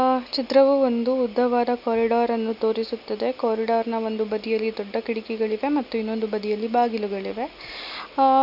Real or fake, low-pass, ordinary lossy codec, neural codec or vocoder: real; 5.4 kHz; none; none